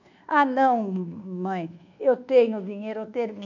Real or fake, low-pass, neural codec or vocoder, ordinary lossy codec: fake; 7.2 kHz; codec, 24 kHz, 1.2 kbps, DualCodec; none